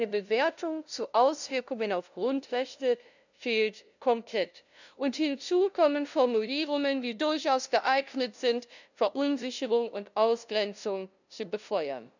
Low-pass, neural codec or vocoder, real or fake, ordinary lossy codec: 7.2 kHz; codec, 16 kHz, 0.5 kbps, FunCodec, trained on LibriTTS, 25 frames a second; fake; none